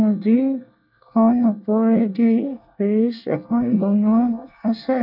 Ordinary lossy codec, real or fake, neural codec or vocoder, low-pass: none; fake; codec, 24 kHz, 1 kbps, SNAC; 5.4 kHz